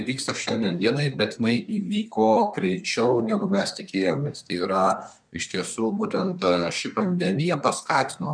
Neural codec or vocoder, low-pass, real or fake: codec, 24 kHz, 1 kbps, SNAC; 9.9 kHz; fake